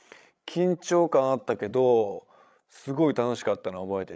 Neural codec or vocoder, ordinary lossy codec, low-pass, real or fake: codec, 16 kHz, 16 kbps, FreqCodec, larger model; none; none; fake